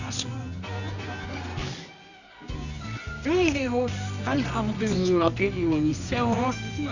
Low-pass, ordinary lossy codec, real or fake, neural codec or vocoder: 7.2 kHz; none; fake; codec, 24 kHz, 0.9 kbps, WavTokenizer, medium music audio release